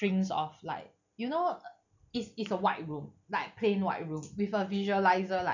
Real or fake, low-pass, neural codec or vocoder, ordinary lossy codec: real; 7.2 kHz; none; none